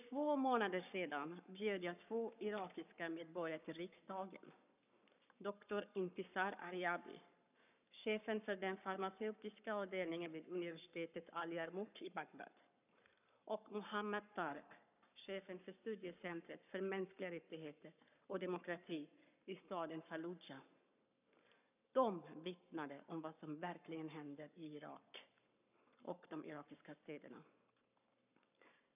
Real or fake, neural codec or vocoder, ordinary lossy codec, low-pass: fake; codec, 44.1 kHz, 7.8 kbps, Pupu-Codec; none; 3.6 kHz